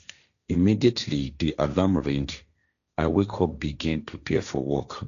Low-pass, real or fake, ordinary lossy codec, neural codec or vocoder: 7.2 kHz; fake; none; codec, 16 kHz, 1.1 kbps, Voila-Tokenizer